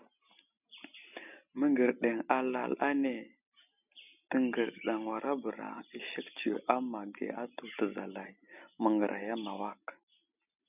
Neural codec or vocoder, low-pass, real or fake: none; 3.6 kHz; real